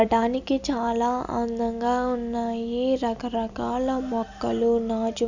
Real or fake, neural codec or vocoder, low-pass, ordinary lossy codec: real; none; 7.2 kHz; none